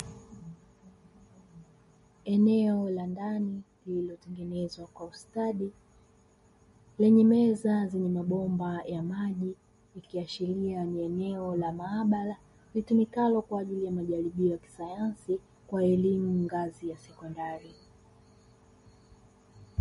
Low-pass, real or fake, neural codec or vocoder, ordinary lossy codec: 19.8 kHz; real; none; MP3, 48 kbps